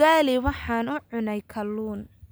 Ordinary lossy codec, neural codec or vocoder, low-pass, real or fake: none; none; none; real